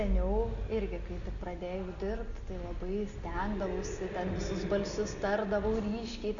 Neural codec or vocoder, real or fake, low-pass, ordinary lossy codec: none; real; 7.2 kHz; MP3, 96 kbps